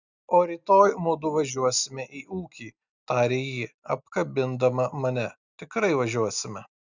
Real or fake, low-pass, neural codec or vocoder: real; 7.2 kHz; none